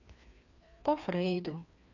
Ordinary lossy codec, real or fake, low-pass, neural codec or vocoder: none; fake; 7.2 kHz; codec, 16 kHz, 2 kbps, FreqCodec, larger model